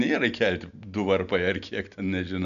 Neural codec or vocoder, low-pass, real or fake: none; 7.2 kHz; real